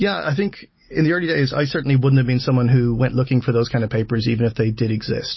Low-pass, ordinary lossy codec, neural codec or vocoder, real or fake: 7.2 kHz; MP3, 24 kbps; none; real